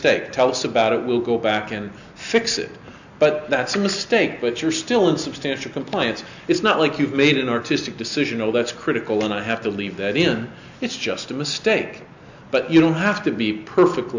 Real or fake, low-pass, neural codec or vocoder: real; 7.2 kHz; none